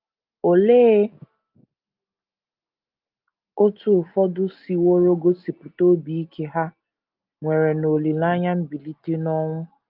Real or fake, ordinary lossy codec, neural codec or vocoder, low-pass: real; Opus, 32 kbps; none; 5.4 kHz